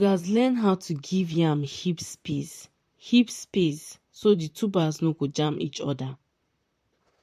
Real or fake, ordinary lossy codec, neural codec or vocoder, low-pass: fake; MP3, 64 kbps; vocoder, 44.1 kHz, 128 mel bands, Pupu-Vocoder; 14.4 kHz